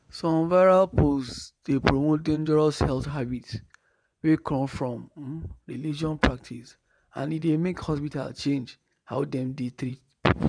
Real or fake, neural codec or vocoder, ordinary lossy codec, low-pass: fake; vocoder, 24 kHz, 100 mel bands, Vocos; none; 9.9 kHz